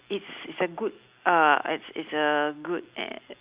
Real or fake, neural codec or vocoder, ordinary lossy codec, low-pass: real; none; Opus, 64 kbps; 3.6 kHz